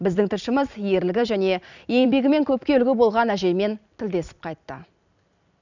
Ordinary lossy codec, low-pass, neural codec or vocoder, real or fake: none; 7.2 kHz; vocoder, 44.1 kHz, 128 mel bands every 256 samples, BigVGAN v2; fake